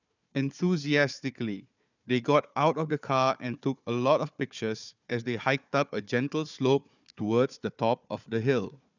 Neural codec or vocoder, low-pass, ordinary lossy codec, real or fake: codec, 16 kHz, 4 kbps, FunCodec, trained on Chinese and English, 50 frames a second; 7.2 kHz; none; fake